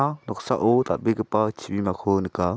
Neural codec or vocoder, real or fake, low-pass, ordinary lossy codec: none; real; none; none